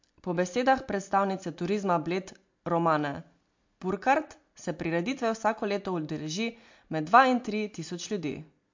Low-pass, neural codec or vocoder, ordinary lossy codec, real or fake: 7.2 kHz; none; MP3, 48 kbps; real